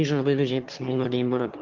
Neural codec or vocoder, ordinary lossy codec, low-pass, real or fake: autoencoder, 22.05 kHz, a latent of 192 numbers a frame, VITS, trained on one speaker; Opus, 16 kbps; 7.2 kHz; fake